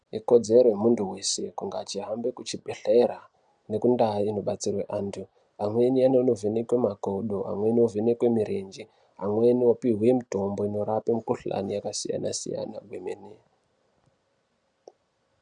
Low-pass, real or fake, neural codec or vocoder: 9.9 kHz; real; none